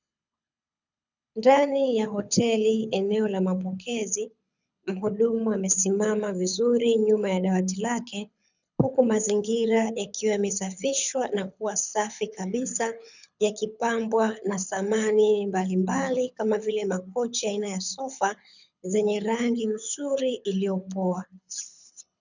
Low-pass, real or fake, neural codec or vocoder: 7.2 kHz; fake; codec, 24 kHz, 6 kbps, HILCodec